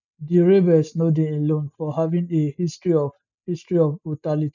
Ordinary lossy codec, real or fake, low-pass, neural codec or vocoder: none; real; 7.2 kHz; none